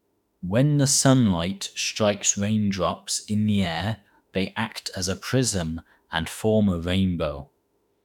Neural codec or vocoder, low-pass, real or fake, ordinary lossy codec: autoencoder, 48 kHz, 32 numbers a frame, DAC-VAE, trained on Japanese speech; 19.8 kHz; fake; none